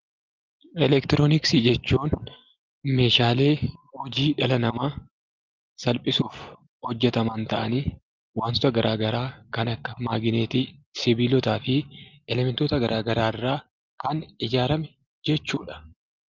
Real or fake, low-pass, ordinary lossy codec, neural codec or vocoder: real; 7.2 kHz; Opus, 24 kbps; none